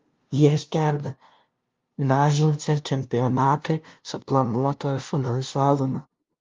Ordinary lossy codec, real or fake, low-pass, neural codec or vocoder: Opus, 32 kbps; fake; 7.2 kHz; codec, 16 kHz, 0.5 kbps, FunCodec, trained on LibriTTS, 25 frames a second